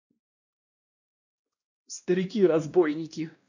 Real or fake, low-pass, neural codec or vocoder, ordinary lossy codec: fake; 7.2 kHz; codec, 16 kHz, 1 kbps, X-Codec, WavLM features, trained on Multilingual LibriSpeech; none